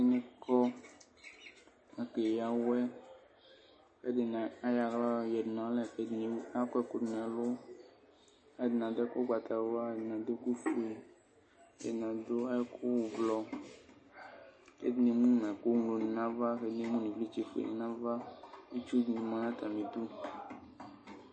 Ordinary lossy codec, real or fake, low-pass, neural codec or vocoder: MP3, 32 kbps; real; 9.9 kHz; none